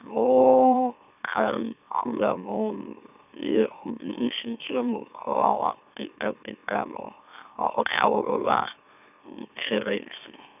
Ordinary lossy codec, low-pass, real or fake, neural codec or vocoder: none; 3.6 kHz; fake; autoencoder, 44.1 kHz, a latent of 192 numbers a frame, MeloTTS